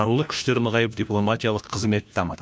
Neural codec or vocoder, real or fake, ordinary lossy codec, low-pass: codec, 16 kHz, 1 kbps, FunCodec, trained on LibriTTS, 50 frames a second; fake; none; none